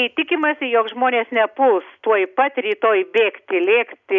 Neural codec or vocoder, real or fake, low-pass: none; real; 7.2 kHz